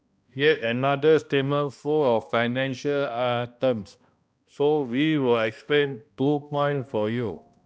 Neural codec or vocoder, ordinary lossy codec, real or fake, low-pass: codec, 16 kHz, 1 kbps, X-Codec, HuBERT features, trained on balanced general audio; none; fake; none